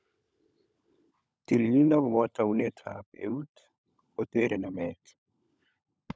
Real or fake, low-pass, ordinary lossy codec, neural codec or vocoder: fake; none; none; codec, 16 kHz, 16 kbps, FunCodec, trained on LibriTTS, 50 frames a second